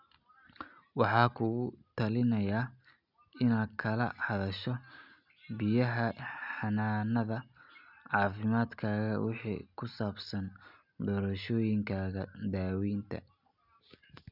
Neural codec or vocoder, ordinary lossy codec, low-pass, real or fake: none; none; 5.4 kHz; real